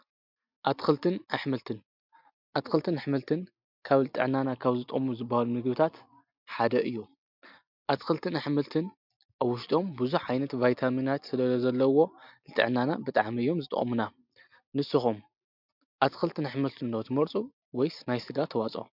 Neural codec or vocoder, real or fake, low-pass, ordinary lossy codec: none; real; 5.4 kHz; MP3, 48 kbps